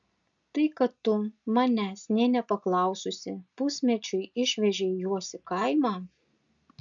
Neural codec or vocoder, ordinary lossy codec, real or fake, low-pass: none; MP3, 64 kbps; real; 7.2 kHz